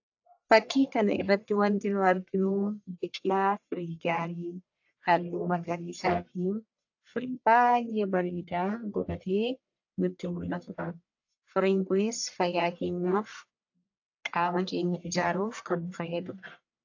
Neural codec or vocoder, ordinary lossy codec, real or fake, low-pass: codec, 44.1 kHz, 1.7 kbps, Pupu-Codec; AAC, 48 kbps; fake; 7.2 kHz